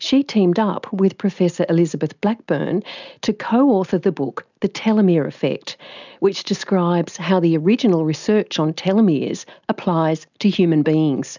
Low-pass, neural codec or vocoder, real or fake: 7.2 kHz; none; real